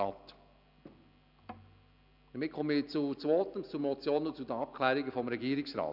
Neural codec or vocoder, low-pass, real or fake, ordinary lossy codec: none; 5.4 kHz; real; none